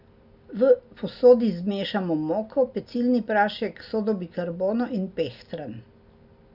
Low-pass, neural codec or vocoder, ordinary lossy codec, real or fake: 5.4 kHz; none; none; real